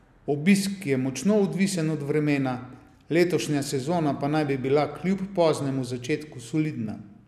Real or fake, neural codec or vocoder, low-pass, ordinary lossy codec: real; none; 14.4 kHz; none